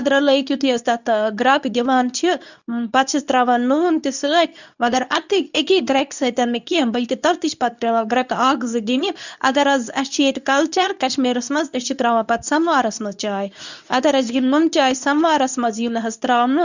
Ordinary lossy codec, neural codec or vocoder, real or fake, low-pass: none; codec, 24 kHz, 0.9 kbps, WavTokenizer, medium speech release version 2; fake; 7.2 kHz